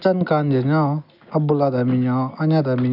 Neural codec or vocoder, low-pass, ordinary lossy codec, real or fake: none; 5.4 kHz; none; real